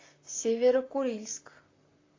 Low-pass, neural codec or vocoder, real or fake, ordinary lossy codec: 7.2 kHz; vocoder, 44.1 kHz, 128 mel bands, Pupu-Vocoder; fake; AAC, 32 kbps